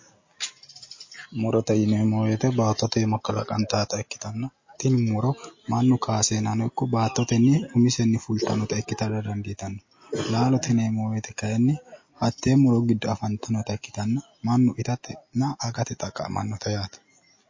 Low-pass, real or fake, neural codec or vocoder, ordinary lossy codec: 7.2 kHz; real; none; MP3, 32 kbps